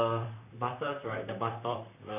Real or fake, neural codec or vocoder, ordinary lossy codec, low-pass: fake; vocoder, 44.1 kHz, 128 mel bands, Pupu-Vocoder; none; 3.6 kHz